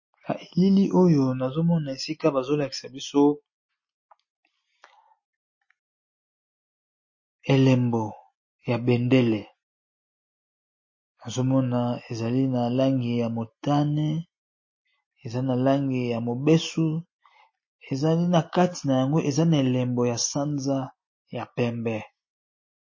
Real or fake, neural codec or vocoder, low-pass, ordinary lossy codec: real; none; 7.2 kHz; MP3, 32 kbps